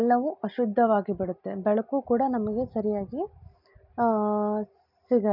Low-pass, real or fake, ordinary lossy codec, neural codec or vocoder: 5.4 kHz; real; none; none